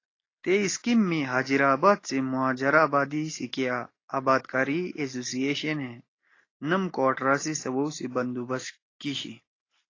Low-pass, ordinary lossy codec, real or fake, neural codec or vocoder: 7.2 kHz; AAC, 32 kbps; real; none